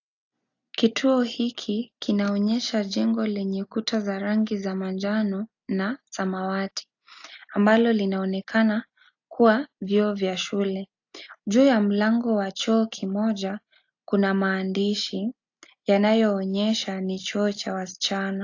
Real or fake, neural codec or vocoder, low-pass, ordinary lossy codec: real; none; 7.2 kHz; AAC, 48 kbps